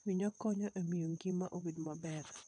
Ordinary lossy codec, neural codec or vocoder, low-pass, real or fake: none; vocoder, 22.05 kHz, 80 mel bands, WaveNeXt; 9.9 kHz; fake